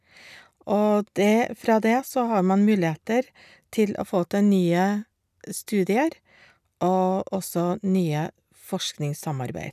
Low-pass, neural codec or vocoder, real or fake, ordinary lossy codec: 14.4 kHz; none; real; none